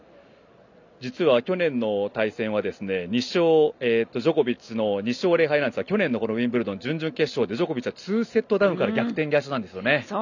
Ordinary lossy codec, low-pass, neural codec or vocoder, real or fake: none; 7.2 kHz; none; real